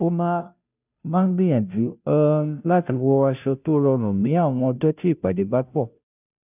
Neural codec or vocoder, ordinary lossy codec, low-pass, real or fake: codec, 16 kHz, 0.5 kbps, FunCodec, trained on Chinese and English, 25 frames a second; none; 3.6 kHz; fake